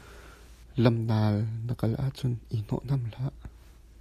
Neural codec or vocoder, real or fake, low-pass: none; real; 14.4 kHz